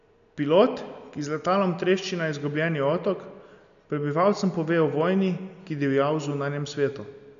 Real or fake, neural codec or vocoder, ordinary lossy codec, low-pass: real; none; none; 7.2 kHz